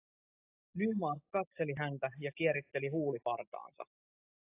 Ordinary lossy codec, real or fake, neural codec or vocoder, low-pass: AAC, 32 kbps; real; none; 3.6 kHz